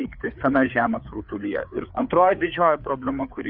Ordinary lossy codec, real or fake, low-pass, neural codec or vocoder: Opus, 64 kbps; fake; 5.4 kHz; codec, 16 kHz, 4 kbps, FreqCodec, larger model